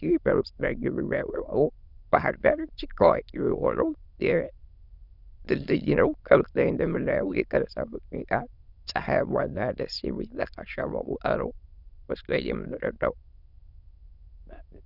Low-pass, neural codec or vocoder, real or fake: 5.4 kHz; autoencoder, 22.05 kHz, a latent of 192 numbers a frame, VITS, trained on many speakers; fake